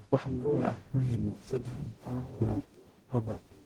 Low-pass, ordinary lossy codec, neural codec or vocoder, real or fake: 19.8 kHz; Opus, 16 kbps; codec, 44.1 kHz, 0.9 kbps, DAC; fake